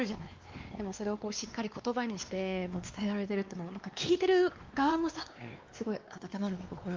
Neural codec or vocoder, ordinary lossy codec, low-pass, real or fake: codec, 16 kHz, 2 kbps, X-Codec, WavLM features, trained on Multilingual LibriSpeech; Opus, 32 kbps; 7.2 kHz; fake